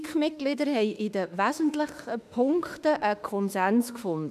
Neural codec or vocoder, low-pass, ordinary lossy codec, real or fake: autoencoder, 48 kHz, 32 numbers a frame, DAC-VAE, trained on Japanese speech; 14.4 kHz; none; fake